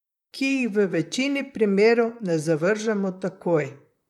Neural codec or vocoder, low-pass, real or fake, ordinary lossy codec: vocoder, 44.1 kHz, 128 mel bands, Pupu-Vocoder; 19.8 kHz; fake; none